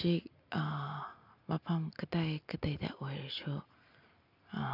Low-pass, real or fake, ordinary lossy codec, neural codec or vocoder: 5.4 kHz; real; none; none